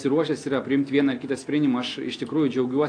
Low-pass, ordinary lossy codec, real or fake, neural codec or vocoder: 9.9 kHz; AAC, 48 kbps; real; none